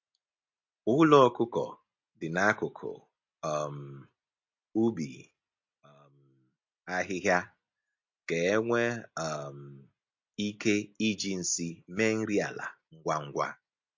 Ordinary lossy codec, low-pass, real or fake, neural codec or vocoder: MP3, 48 kbps; 7.2 kHz; real; none